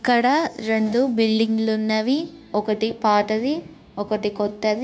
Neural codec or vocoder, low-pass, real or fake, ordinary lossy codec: codec, 16 kHz, 0.9 kbps, LongCat-Audio-Codec; none; fake; none